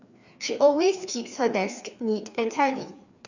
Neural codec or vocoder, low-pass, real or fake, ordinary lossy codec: codec, 16 kHz, 2 kbps, FreqCodec, larger model; 7.2 kHz; fake; Opus, 64 kbps